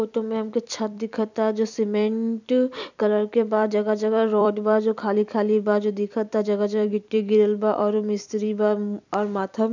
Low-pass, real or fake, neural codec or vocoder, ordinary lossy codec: 7.2 kHz; fake; vocoder, 44.1 kHz, 128 mel bands every 256 samples, BigVGAN v2; none